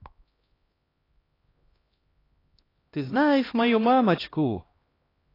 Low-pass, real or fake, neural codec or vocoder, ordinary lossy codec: 5.4 kHz; fake; codec, 16 kHz, 1 kbps, X-Codec, HuBERT features, trained on LibriSpeech; AAC, 24 kbps